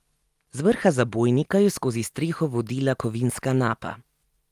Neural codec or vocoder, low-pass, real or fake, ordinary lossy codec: none; 14.4 kHz; real; Opus, 32 kbps